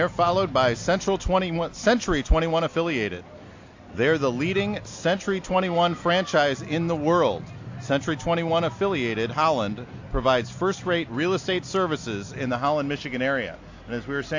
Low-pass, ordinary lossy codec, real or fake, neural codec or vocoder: 7.2 kHz; AAC, 48 kbps; real; none